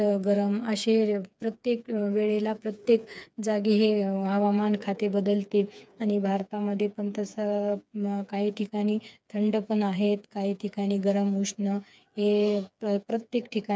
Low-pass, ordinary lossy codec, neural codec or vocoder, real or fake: none; none; codec, 16 kHz, 4 kbps, FreqCodec, smaller model; fake